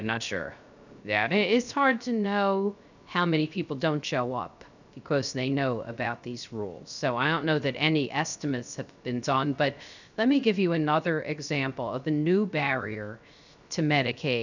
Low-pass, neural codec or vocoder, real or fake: 7.2 kHz; codec, 16 kHz, 0.3 kbps, FocalCodec; fake